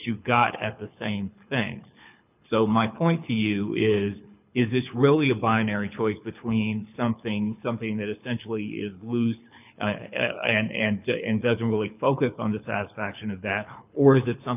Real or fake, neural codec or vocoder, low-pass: fake; codec, 24 kHz, 6 kbps, HILCodec; 3.6 kHz